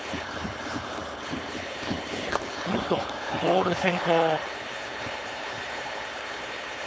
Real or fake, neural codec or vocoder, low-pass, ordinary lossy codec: fake; codec, 16 kHz, 4.8 kbps, FACodec; none; none